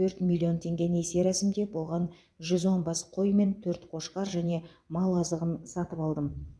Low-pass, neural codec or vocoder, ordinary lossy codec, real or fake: none; vocoder, 22.05 kHz, 80 mel bands, WaveNeXt; none; fake